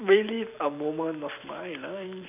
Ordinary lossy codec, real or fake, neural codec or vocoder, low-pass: none; fake; vocoder, 44.1 kHz, 128 mel bands every 256 samples, BigVGAN v2; 3.6 kHz